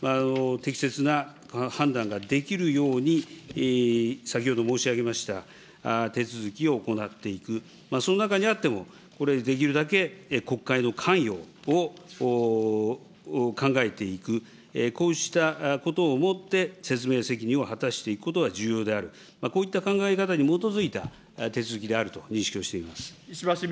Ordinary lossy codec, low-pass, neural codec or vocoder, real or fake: none; none; none; real